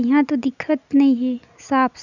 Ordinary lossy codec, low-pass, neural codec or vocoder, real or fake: none; 7.2 kHz; none; real